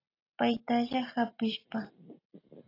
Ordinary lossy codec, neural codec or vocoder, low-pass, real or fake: AAC, 32 kbps; none; 5.4 kHz; real